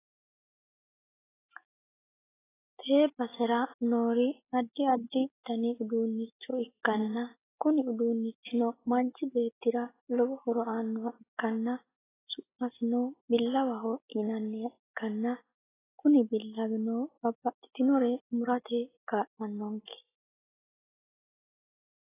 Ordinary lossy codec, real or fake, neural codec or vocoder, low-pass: AAC, 16 kbps; real; none; 3.6 kHz